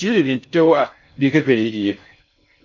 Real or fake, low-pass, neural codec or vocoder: fake; 7.2 kHz; codec, 16 kHz in and 24 kHz out, 0.6 kbps, FocalCodec, streaming, 4096 codes